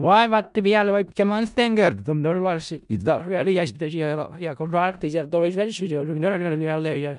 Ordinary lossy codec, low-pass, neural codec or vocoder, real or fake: none; 10.8 kHz; codec, 16 kHz in and 24 kHz out, 0.4 kbps, LongCat-Audio-Codec, four codebook decoder; fake